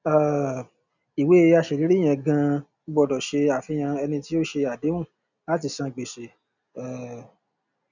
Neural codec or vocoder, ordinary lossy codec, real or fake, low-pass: none; none; real; 7.2 kHz